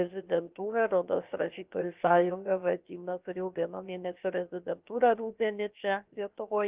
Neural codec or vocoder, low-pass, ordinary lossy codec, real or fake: codec, 16 kHz, 0.7 kbps, FocalCodec; 3.6 kHz; Opus, 24 kbps; fake